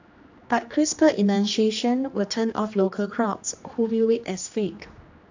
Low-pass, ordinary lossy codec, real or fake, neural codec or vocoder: 7.2 kHz; AAC, 48 kbps; fake; codec, 16 kHz, 2 kbps, X-Codec, HuBERT features, trained on general audio